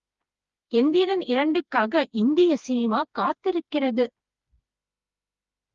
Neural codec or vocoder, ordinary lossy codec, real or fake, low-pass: codec, 16 kHz, 2 kbps, FreqCodec, smaller model; Opus, 32 kbps; fake; 7.2 kHz